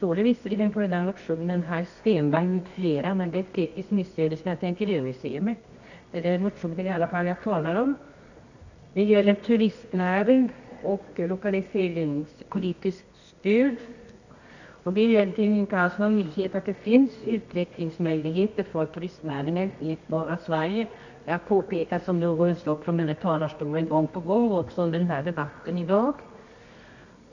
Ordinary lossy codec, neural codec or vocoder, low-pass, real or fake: none; codec, 24 kHz, 0.9 kbps, WavTokenizer, medium music audio release; 7.2 kHz; fake